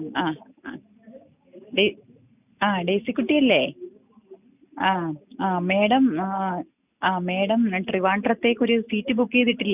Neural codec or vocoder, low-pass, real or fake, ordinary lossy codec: none; 3.6 kHz; real; none